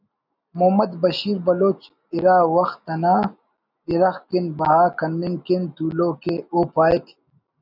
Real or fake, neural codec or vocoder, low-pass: real; none; 5.4 kHz